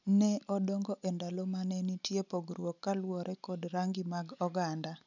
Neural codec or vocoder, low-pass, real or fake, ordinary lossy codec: none; 7.2 kHz; real; none